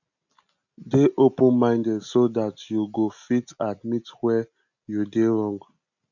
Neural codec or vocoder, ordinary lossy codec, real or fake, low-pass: none; none; real; 7.2 kHz